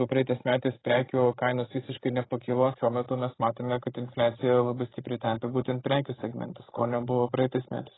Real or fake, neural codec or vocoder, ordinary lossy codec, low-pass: fake; codec, 16 kHz, 16 kbps, FreqCodec, larger model; AAC, 16 kbps; 7.2 kHz